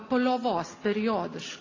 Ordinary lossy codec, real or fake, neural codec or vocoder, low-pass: AAC, 32 kbps; real; none; 7.2 kHz